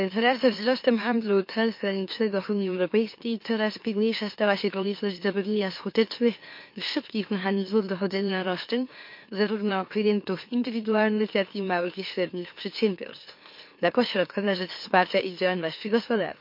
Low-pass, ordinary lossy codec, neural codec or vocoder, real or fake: 5.4 kHz; MP3, 32 kbps; autoencoder, 44.1 kHz, a latent of 192 numbers a frame, MeloTTS; fake